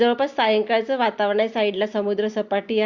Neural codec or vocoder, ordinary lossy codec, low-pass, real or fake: none; Opus, 64 kbps; 7.2 kHz; real